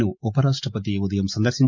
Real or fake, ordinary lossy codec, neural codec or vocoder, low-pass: real; MP3, 48 kbps; none; 7.2 kHz